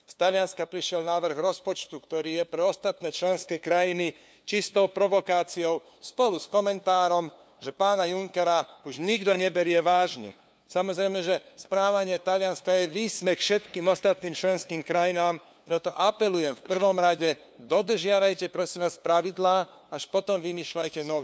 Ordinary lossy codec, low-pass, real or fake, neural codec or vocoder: none; none; fake; codec, 16 kHz, 2 kbps, FunCodec, trained on LibriTTS, 25 frames a second